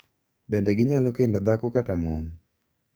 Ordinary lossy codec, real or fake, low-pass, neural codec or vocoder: none; fake; none; codec, 44.1 kHz, 2.6 kbps, SNAC